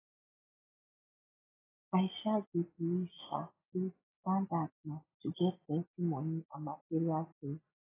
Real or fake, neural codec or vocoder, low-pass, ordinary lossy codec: real; none; 3.6 kHz; AAC, 16 kbps